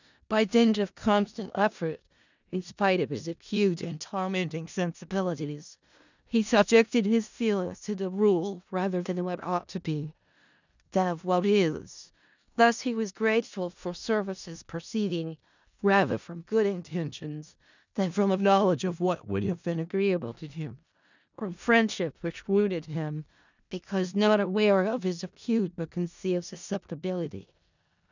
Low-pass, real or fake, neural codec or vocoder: 7.2 kHz; fake; codec, 16 kHz in and 24 kHz out, 0.4 kbps, LongCat-Audio-Codec, four codebook decoder